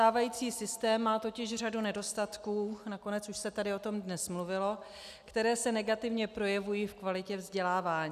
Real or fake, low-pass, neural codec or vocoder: real; 14.4 kHz; none